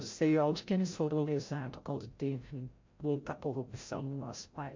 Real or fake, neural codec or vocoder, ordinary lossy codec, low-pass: fake; codec, 16 kHz, 0.5 kbps, FreqCodec, larger model; MP3, 48 kbps; 7.2 kHz